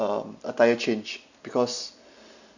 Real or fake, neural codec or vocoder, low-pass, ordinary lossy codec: real; none; 7.2 kHz; none